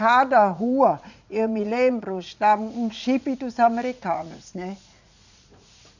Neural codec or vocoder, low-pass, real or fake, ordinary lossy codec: vocoder, 44.1 kHz, 80 mel bands, Vocos; 7.2 kHz; fake; none